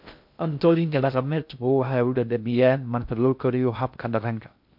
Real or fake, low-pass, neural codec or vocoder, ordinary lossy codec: fake; 5.4 kHz; codec, 16 kHz in and 24 kHz out, 0.6 kbps, FocalCodec, streaming, 2048 codes; MP3, 48 kbps